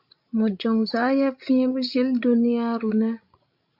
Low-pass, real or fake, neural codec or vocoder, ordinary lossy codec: 5.4 kHz; fake; codec, 16 kHz, 16 kbps, FreqCodec, larger model; MP3, 48 kbps